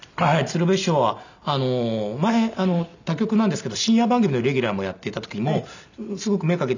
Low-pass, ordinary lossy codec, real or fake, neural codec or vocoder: 7.2 kHz; none; real; none